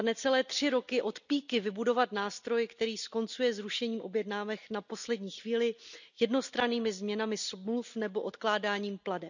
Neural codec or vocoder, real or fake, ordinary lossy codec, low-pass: none; real; none; 7.2 kHz